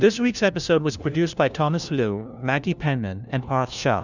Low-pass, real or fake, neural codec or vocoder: 7.2 kHz; fake; codec, 16 kHz, 1 kbps, FunCodec, trained on LibriTTS, 50 frames a second